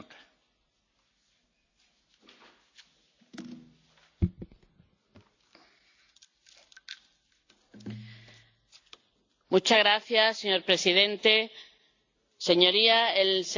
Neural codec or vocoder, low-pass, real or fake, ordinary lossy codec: none; 7.2 kHz; real; AAC, 48 kbps